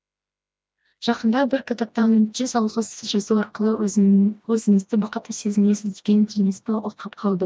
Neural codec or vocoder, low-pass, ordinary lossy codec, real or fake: codec, 16 kHz, 1 kbps, FreqCodec, smaller model; none; none; fake